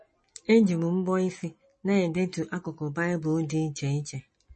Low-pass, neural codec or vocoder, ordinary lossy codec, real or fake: 10.8 kHz; none; MP3, 32 kbps; real